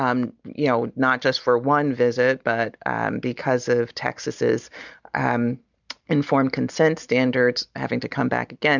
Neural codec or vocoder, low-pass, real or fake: none; 7.2 kHz; real